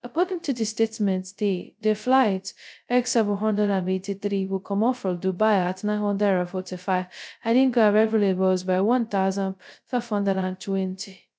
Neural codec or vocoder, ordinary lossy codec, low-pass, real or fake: codec, 16 kHz, 0.2 kbps, FocalCodec; none; none; fake